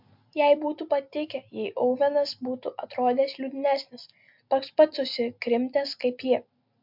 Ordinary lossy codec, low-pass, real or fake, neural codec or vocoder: MP3, 48 kbps; 5.4 kHz; real; none